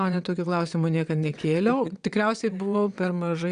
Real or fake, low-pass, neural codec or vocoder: fake; 9.9 kHz; vocoder, 22.05 kHz, 80 mel bands, WaveNeXt